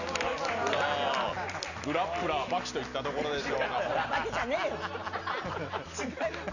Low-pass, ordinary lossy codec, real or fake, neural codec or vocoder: 7.2 kHz; none; real; none